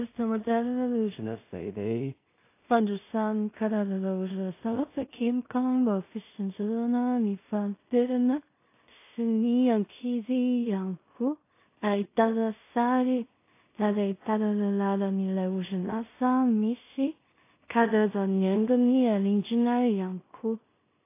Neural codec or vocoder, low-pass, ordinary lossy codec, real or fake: codec, 16 kHz in and 24 kHz out, 0.4 kbps, LongCat-Audio-Codec, two codebook decoder; 3.6 kHz; AAC, 24 kbps; fake